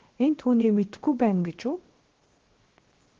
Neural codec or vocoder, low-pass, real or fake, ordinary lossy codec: codec, 16 kHz, 0.7 kbps, FocalCodec; 7.2 kHz; fake; Opus, 16 kbps